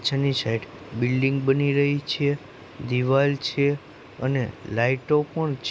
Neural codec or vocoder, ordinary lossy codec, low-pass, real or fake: none; none; none; real